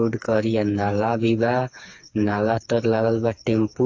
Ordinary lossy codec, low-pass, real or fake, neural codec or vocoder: AAC, 48 kbps; 7.2 kHz; fake; codec, 16 kHz, 4 kbps, FreqCodec, smaller model